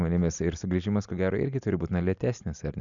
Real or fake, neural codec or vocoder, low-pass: real; none; 7.2 kHz